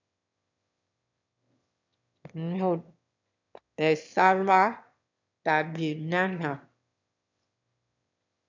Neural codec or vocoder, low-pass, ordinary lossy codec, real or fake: autoencoder, 22.05 kHz, a latent of 192 numbers a frame, VITS, trained on one speaker; 7.2 kHz; MP3, 64 kbps; fake